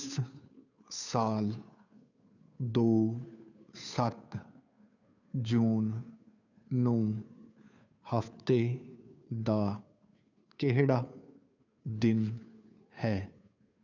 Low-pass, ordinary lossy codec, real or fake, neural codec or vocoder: 7.2 kHz; none; fake; codec, 16 kHz, 2 kbps, FunCodec, trained on Chinese and English, 25 frames a second